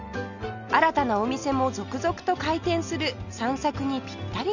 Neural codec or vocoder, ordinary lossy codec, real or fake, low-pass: none; none; real; 7.2 kHz